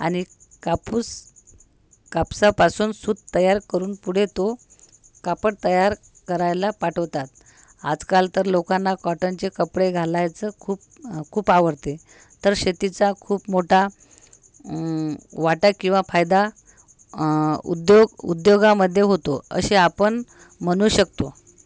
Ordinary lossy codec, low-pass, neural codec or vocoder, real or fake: none; none; none; real